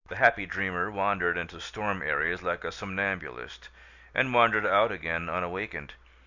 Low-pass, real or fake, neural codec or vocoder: 7.2 kHz; real; none